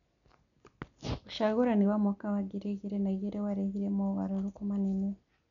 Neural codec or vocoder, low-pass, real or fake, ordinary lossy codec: none; 7.2 kHz; real; none